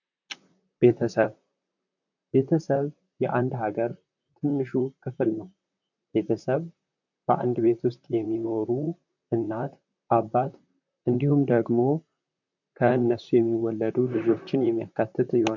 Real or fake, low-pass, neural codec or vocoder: fake; 7.2 kHz; vocoder, 44.1 kHz, 128 mel bands, Pupu-Vocoder